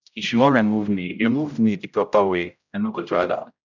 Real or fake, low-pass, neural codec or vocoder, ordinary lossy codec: fake; 7.2 kHz; codec, 16 kHz, 0.5 kbps, X-Codec, HuBERT features, trained on general audio; none